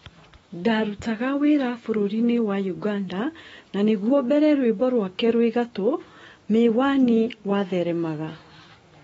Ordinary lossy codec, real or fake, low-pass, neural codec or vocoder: AAC, 24 kbps; fake; 19.8 kHz; autoencoder, 48 kHz, 128 numbers a frame, DAC-VAE, trained on Japanese speech